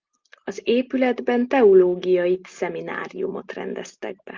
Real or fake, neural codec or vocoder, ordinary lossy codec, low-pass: real; none; Opus, 32 kbps; 7.2 kHz